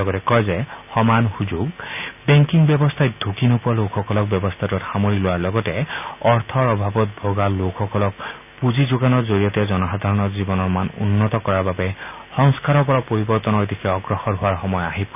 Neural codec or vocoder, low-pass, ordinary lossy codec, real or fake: none; 3.6 kHz; none; real